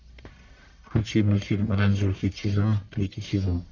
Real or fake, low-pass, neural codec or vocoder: fake; 7.2 kHz; codec, 44.1 kHz, 1.7 kbps, Pupu-Codec